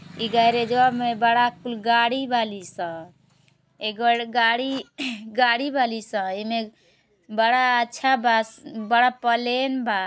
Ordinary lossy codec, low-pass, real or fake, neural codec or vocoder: none; none; real; none